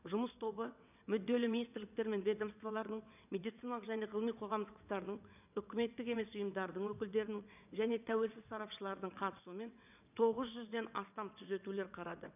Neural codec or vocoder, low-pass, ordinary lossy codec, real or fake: vocoder, 22.05 kHz, 80 mel bands, WaveNeXt; 3.6 kHz; none; fake